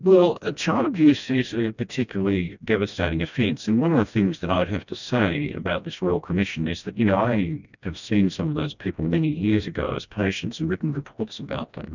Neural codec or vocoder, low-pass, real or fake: codec, 16 kHz, 1 kbps, FreqCodec, smaller model; 7.2 kHz; fake